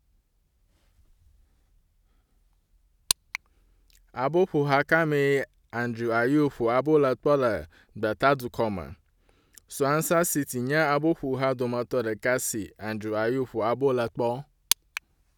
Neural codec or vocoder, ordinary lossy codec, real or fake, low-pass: none; none; real; 19.8 kHz